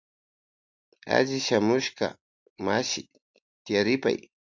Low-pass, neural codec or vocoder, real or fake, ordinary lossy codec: 7.2 kHz; none; real; MP3, 64 kbps